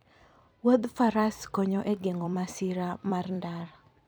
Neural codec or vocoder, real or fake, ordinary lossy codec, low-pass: vocoder, 44.1 kHz, 128 mel bands every 256 samples, BigVGAN v2; fake; none; none